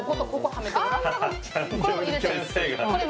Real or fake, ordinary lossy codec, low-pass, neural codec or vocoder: real; none; none; none